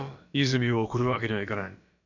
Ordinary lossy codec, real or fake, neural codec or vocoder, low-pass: none; fake; codec, 16 kHz, about 1 kbps, DyCAST, with the encoder's durations; 7.2 kHz